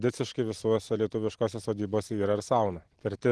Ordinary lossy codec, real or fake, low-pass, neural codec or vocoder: Opus, 16 kbps; real; 10.8 kHz; none